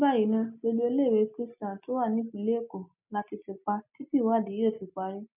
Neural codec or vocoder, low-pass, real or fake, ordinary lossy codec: none; 3.6 kHz; real; none